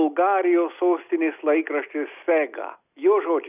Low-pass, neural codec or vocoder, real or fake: 3.6 kHz; none; real